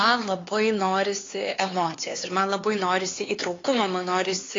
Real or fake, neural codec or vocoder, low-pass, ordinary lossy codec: fake; codec, 16 kHz, 4 kbps, X-Codec, HuBERT features, trained on LibriSpeech; 7.2 kHz; AAC, 32 kbps